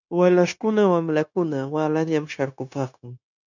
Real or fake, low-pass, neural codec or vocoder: fake; 7.2 kHz; codec, 16 kHz, 0.9 kbps, LongCat-Audio-Codec